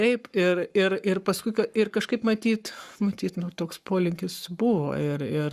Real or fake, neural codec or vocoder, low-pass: fake; codec, 44.1 kHz, 7.8 kbps, Pupu-Codec; 14.4 kHz